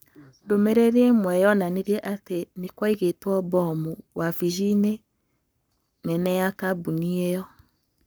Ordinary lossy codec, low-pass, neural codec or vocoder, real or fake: none; none; codec, 44.1 kHz, 7.8 kbps, Pupu-Codec; fake